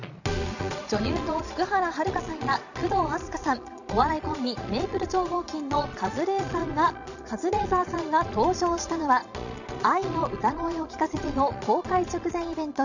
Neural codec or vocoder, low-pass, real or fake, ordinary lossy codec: vocoder, 22.05 kHz, 80 mel bands, Vocos; 7.2 kHz; fake; none